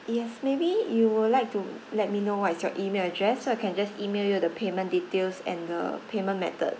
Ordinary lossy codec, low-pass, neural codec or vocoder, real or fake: none; none; none; real